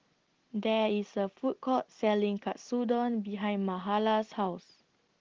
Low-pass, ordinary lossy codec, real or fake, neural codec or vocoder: 7.2 kHz; Opus, 16 kbps; real; none